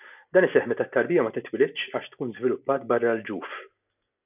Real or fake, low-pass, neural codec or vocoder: real; 3.6 kHz; none